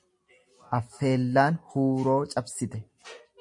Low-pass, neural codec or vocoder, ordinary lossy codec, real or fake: 10.8 kHz; none; MP3, 48 kbps; real